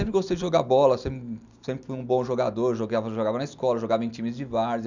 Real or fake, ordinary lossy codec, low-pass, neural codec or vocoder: real; none; 7.2 kHz; none